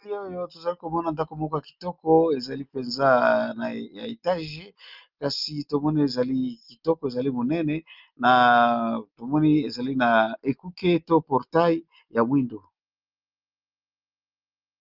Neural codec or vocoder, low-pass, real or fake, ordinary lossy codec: none; 5.4 kHz; real; Opus, 24 kbps